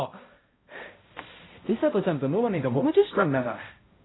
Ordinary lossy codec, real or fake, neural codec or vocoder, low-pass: AAC, 16 kbps; fake; codec, 16 kHz, 0.5 kbps, X-Codec, HuBERT features, trained on balanced general audio; 7.2 kHz